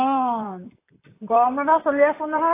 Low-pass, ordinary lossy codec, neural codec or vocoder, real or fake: 3.6 kHz; AAC, 24 kbps; vocoder, 44.1 kHz, 128 mel bands, Pupu-Vocoder; fake